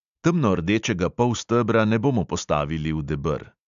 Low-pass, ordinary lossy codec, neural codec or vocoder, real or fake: 7.2 kHz; MP3, 64 kbps; none; real